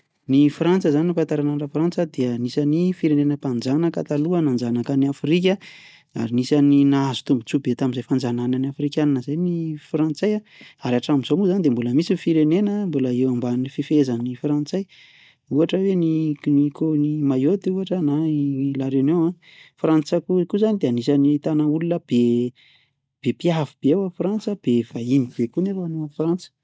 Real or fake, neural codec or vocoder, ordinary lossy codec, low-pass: real; none; none; none